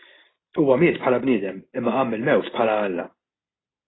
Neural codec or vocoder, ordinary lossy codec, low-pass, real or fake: none; AAC, 16 kbps; 7.2 kHz; real